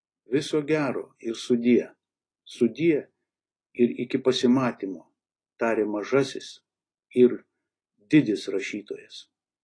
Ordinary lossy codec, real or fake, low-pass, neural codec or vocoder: AAC, 32 kbps; real; 9.9 kHz; none